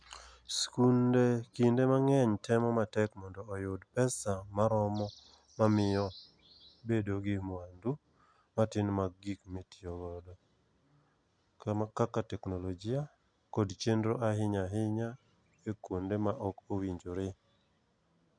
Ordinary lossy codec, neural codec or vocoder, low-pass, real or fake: none; none; 9.9 kHz; real